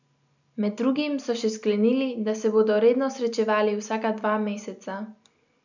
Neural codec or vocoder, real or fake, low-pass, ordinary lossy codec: none; real; 7.2 kHz; none